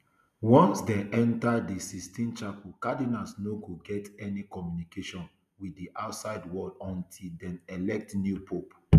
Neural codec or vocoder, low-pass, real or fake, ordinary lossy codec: none; 14.4 kHz; real; none